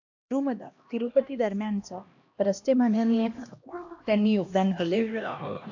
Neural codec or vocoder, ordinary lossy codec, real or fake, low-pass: codec, 16 kHz, 1 kbps, X-Codec, HuBERT features, trained on LibriSpeech; AAC, 48 kbps; fake; 7.2 kHz